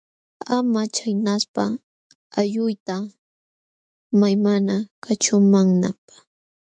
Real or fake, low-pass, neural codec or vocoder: fake; 9.9 kHz; autoencoder, 48 kHz, 128 numbers a frame, DAC-VAE, trained on Japanese speech